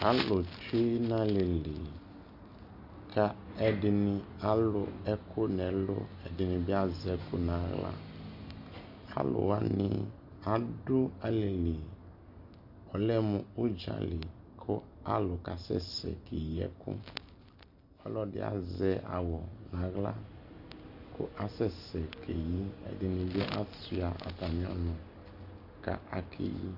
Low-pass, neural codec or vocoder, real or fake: 5.4 kHz; none; real